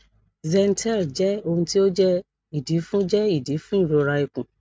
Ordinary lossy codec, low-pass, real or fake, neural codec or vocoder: none; none; real; none